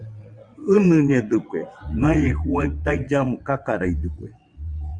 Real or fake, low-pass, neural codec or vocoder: fake; 9.9 kHz; vocoder, 22.05 kHz, 80 mel bands, WaveNeXt